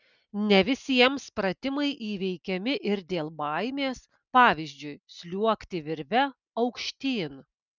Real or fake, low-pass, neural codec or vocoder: real; 7.2 kHz; none